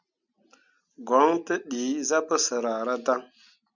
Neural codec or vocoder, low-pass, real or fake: none; 7.2 kHz; real